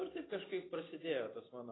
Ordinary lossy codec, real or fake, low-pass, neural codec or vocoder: AAC, 16 kbps; real; 7.2 kHz; none